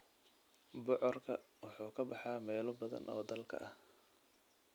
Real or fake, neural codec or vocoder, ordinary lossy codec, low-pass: real; none; none; none